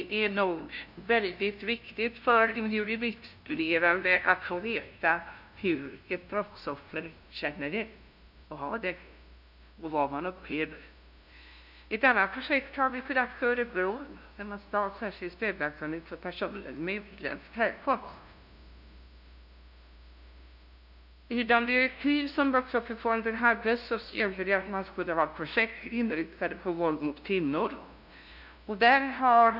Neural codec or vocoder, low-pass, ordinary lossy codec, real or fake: codec, 16 kHz, 0.5 kbps, FunCodec, trained on LibriTTS, 25 frames a second; 5.4 kHz; none; fake